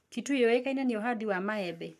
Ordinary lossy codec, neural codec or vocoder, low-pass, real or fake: AAC, 96 kbps; codec, 44.1 kHz, 7.8 kbps, Pupu-Codec; 14.4 kHz; fake